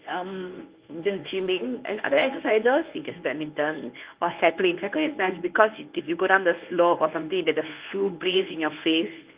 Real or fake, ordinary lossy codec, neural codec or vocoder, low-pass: fake; Opus, 24 kbps; codec, 24 kHz, 0.9 kbps, WavTokenizer, medium speech release version 1; 3.6 kHz